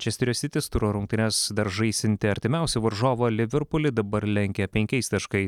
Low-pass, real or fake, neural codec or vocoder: 19.8 kHz; real; none